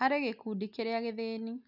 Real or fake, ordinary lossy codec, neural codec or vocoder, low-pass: real; none; none; 5.4 kHz